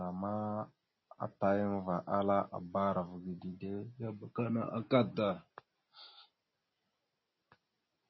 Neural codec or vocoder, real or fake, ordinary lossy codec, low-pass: none; real; MP3, 24 kbps; 7.2 kHz